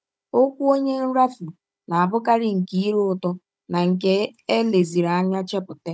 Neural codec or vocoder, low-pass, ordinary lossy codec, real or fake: codec, 16 kHz, 16 kbps, FunCodec, trained on Chinese and English, 50 frames a second; none; none; fake